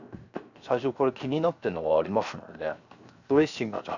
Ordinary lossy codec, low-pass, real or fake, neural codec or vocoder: none; 7.2 kHz; fake; codec, 16 kHz, 0.7 kbps, FocalCodec